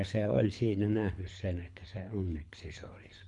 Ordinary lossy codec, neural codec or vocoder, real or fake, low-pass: MP3, 64 kbps; codec, 24 kHz, 3 kbps, HILCodec; fake; 10.8 kHz